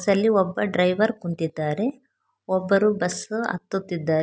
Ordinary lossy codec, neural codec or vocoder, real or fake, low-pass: none; none; real; none